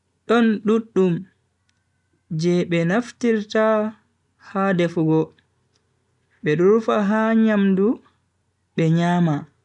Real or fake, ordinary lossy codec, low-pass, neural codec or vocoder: real; none; 10.8 kHz; none